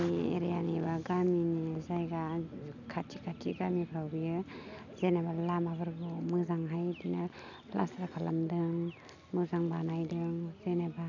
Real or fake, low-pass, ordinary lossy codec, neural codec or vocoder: real; 7.2 kHz; none; none